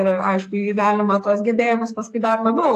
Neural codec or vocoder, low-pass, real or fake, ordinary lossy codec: codec, 44.1 kHz, 2.6 kbps, SNAC; 14.4 kHz; fake; AAC, 64 kbps